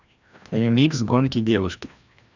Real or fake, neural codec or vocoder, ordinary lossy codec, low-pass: fake; codec, 16 kHz, 1 kbps, FreqCodec, larger model; none; 7.2 kHz